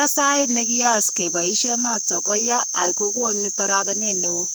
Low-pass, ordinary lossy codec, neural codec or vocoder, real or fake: none; none; codec, 44.1 kHz, 2.6 kbps, SNAC; fake